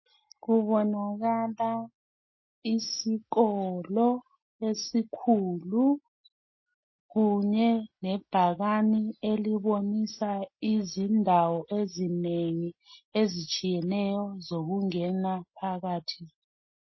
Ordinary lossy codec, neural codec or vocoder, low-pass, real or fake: MP3, 24 kbps; none; 7.2 kHz; real